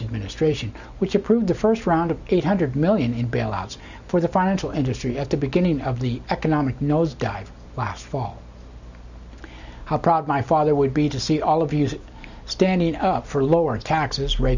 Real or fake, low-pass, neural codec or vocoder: real; 7.2 kHz; none